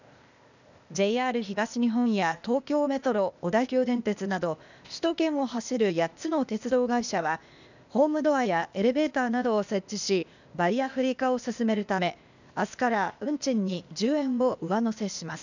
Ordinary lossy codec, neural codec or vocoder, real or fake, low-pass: none; codec, 16 kHz, 0.8 kbps, ZipCodec; fake; 7.2 kHz